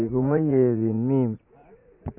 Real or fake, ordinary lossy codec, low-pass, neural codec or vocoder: fake; none; 3.6 kHz; vocoder, 44.1 kHz, 80 mel bands, Vocos